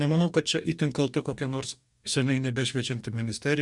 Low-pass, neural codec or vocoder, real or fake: 10.8 kHz; codec, 44.1 kHz, 2.6 kbps, DAC; fake